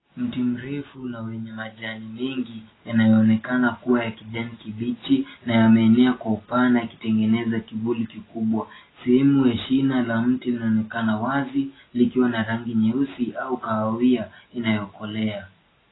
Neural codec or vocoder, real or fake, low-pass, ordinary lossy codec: none; real; 7.2 kHz; AAC, 16 kbps